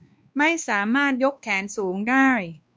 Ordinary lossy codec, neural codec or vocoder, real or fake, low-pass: none; codec, 16 kHz, 2 kbps, X-Codec, WavLM features, trained on Multilingual LibriSpeech; fake; none